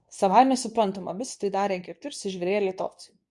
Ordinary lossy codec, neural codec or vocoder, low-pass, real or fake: MP3, 96 kbps; codec, 24 kHz, 0.9 kbps, WavTokenizer, medium speech release version 2; 10.8 kHz; fake